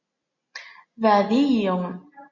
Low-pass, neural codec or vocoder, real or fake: 7.2 kHz; none; real